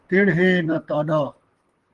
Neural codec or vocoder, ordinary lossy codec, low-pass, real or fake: vocoder, 44.1 kHz, 128 mel bands, Pupu-Vocoder; Opus, 24 kbps; 10.8 kHz; fake